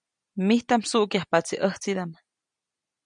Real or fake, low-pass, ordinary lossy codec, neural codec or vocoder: real; 9.9 kHz; MP3, 96 kbps; none